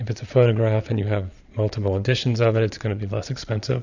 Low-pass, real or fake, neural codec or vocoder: 7.2 kHz; fake; vocoder, 22.05 kHz, 80 mel bands, Vocos